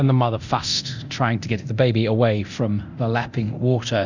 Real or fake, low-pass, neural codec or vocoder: fake; 7.2 kHz; codec, 24 kHz, 0.9 kbps, DualCodec